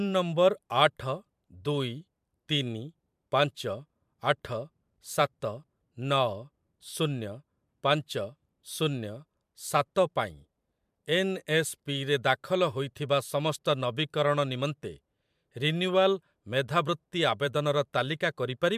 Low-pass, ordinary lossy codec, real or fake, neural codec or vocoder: 14.4 kHz; MP3, 96 kbps; fake; vocoder, 44.1 kHz, 128 mel bands every 512 samples, BigVGAN v2